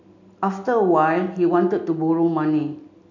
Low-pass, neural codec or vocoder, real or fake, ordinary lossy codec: 7.2 kHz; none; real; none